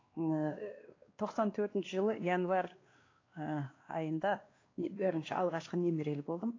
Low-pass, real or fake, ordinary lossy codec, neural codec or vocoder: 7.2 kHz; fake; AAC, 32 kbps; codec, 16 kHz, 2 kbps, X-Codec, WavLM features, trained on Multilingual LibriSpeech